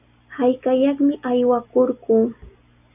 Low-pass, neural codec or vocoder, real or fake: 3.6 kHz; none; real